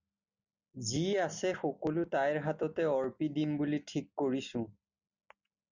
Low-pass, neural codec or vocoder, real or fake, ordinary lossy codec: 7.2 kHz; none; real; Opus, 64 kbps